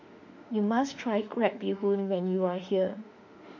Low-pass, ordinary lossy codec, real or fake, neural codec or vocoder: 7.2 kHz; MP3, 64 kbps; fake; autoencoder, 48 kHz, 32 numbers a frame, DAC-VAE, trained on Japanese speech